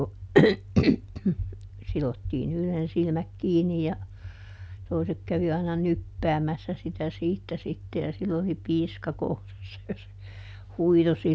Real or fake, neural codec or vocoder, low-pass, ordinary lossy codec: real; none; none; none